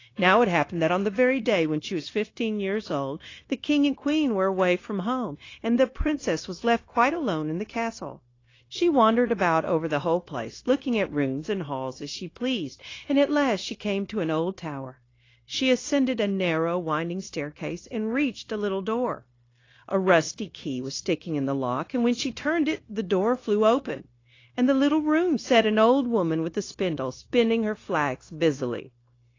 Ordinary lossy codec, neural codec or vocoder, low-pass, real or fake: AAC, 32 kbps; codec, 16 kHz, 0.9 kbps, LongCat-Audio-Codec; 7.2 kHz; fake